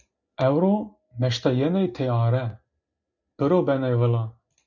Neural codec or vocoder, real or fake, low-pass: none; real; 7.2 kHz